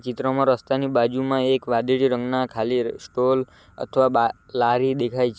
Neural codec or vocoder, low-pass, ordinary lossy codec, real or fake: none; none; none; real